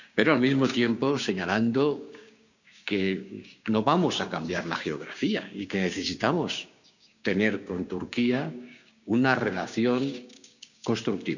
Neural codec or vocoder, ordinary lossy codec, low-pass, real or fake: codec, 16 kHz, 6 kbps, DAC; none; 7.2 kHz; fake